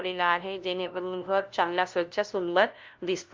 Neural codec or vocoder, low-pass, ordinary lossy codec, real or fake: codec, 16 kHz, 0.5 kbps, FunCodec, trained on LibriTTS, 25 frames a second; 7.2 kHz; Opus, 32 kbps; fake